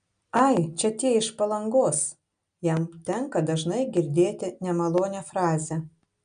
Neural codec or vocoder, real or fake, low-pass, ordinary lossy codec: none; real; 9.9 kHz; AAC, 96 kbps